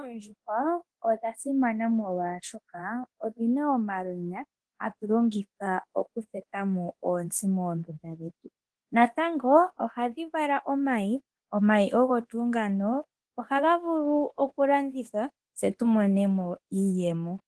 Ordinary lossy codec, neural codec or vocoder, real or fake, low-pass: Opus, 16 kbps; codec, 24 kHz, 0.9 kbps, DualCodec; fake; 10.8 kHz